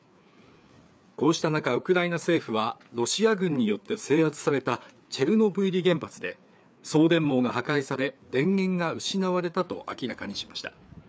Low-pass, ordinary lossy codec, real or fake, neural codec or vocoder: none; none; fake; codec, 16 kHz, 4 kbps, FreqCodec, larger model